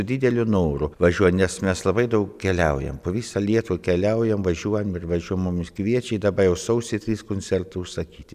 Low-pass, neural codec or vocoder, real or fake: 14.4 kHz; none; real